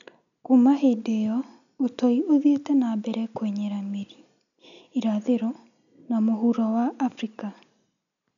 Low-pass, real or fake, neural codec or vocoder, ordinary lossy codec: 7.2 kHz; real; none; none